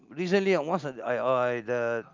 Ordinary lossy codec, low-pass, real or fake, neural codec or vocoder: Opus, 24 kbps; 7.2 kHz; real; none